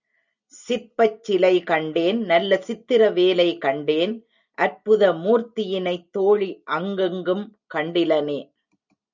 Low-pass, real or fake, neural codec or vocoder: 7.2 kHz; real; none